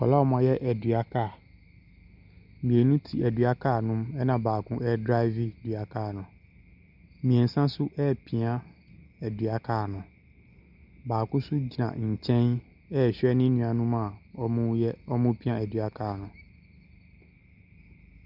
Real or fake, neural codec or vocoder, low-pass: real; none; 5.4 kHz